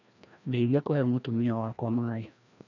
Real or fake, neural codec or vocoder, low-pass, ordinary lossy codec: fake; codec, 16 kHz, 1 kbps, FreqCodec, larger model; 7.2 kHz; none